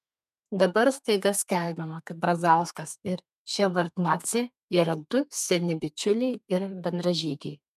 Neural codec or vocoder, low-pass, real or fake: codec, 32 kHz, 1.9 kbps, SNAC; 14.4 kHz; fake